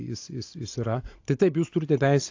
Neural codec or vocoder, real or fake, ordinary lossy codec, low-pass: none; real; AAC, 48 kbps; 7.2 kHz